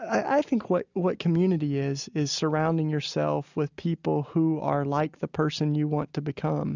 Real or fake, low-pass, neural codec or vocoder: real; 7.2 kHz; none